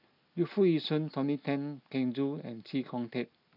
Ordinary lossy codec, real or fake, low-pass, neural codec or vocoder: none; real; 5.4 kHz; none